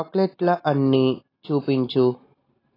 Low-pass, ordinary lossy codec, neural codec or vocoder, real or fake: 5.4 kHz; AAC, 24 kbps; none; real